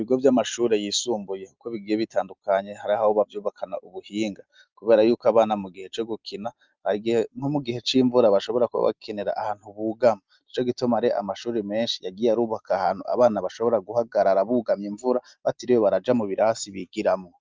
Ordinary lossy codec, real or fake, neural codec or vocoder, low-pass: Opus, 24 kbps; real; none; 7.2 kHz